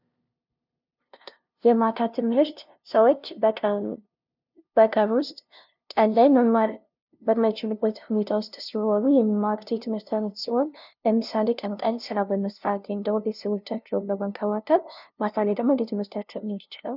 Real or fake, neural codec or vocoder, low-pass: fake; codec, 16 kHz, 0.5 kbps, FunCodec, trained on LibriTTS, 25 frames a second; 5.4 kHz